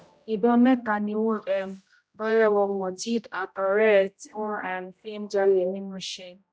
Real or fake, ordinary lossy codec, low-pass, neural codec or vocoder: fake; none; none; codec, 16 kHz, 0.5 kbps, X-Codec, HuBERT features, trained on general audio